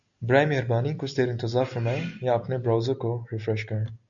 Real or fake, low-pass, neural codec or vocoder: real; 7.2 kHz; none